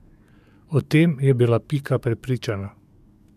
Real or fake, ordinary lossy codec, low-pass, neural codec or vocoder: fake; MP3, 96 kbps; 14.4 kHz; codec, 44.1 kHz, 7.8 kbps, DAC